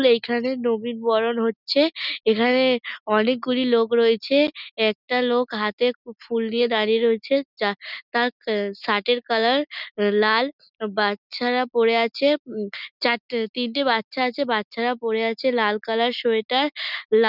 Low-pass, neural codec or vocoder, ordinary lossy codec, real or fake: 5.4 kHz; none; none; real